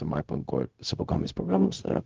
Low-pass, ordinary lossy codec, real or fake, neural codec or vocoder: 7.2 kHz; Opus, 32 kbps; fake; codec, 16 kHz, 0.4 kbps, LongCat-Audio-Codec